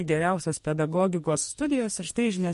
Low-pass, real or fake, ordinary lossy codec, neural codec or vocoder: 14.4 kHz; fake; MP3, 48 kbps; codec, 44.1 kHz, 2.6 kbps, SNAC